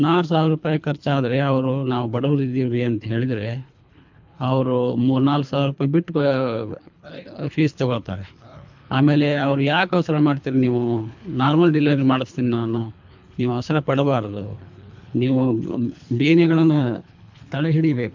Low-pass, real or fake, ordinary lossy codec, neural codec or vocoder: 7.2 kHz; fake; MP3, 64 kbps; codec, 24 kHz, 3 kbps, HILCodec